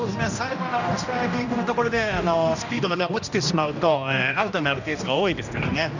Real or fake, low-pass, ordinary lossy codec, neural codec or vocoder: fake; 7.2 kHz; none; codec, 16 kHz, 1 kbps, X-Codec, HuBERT features, trained on general audio